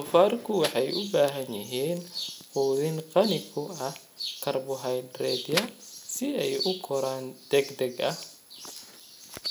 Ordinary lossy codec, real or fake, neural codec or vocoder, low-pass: none; real; none; none